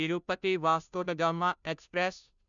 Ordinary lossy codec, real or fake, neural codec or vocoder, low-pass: none; fake; codec, 16 kHz, 0.5 kbps, FunCodec, trained on Chinese and English, 25 frames a second; 7.2 kHz